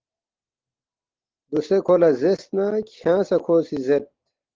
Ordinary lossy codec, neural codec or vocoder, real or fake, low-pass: Opus, 16 kbps; none; real; 7.2 kHz